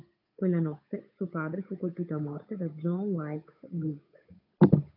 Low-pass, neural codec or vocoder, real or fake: 5.4 kHz; codec, 16 kHz, 8 kbps, FunCodec, trained on Chinese and English, 25 frames a second; fake